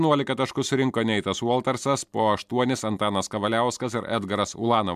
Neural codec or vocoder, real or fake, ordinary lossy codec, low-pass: none; real; MP3, 96 kbps; 14.4 kHz